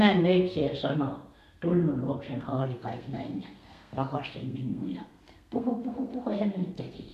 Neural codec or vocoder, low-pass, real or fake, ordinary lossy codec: codec, 32 kHz, 1.9 kbps, SNAC; 14.4 kHz; fake; none